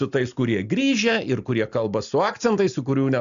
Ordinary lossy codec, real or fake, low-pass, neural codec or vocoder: AAC, 96 kbps; real; 7.2 kHz; none